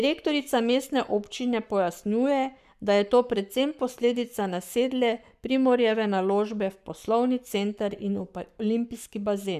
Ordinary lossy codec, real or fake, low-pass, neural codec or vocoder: none; fake; 14.4 kHz; codec, 44.1 kHz, 7.8 kbps, Pupu-Codec